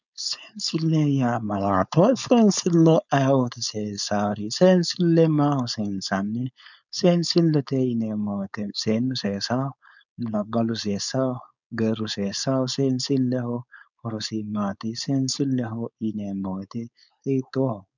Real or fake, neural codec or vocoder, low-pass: fake; codec, 16 kHz, 4.8 kbps, FACodec; 7.2 kHz